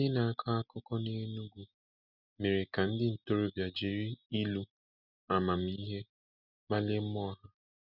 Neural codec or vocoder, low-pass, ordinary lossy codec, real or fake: none; 5.4 kHz; none; real